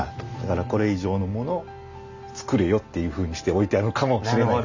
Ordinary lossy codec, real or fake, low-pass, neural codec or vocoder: none; real; 7.2 kHz; none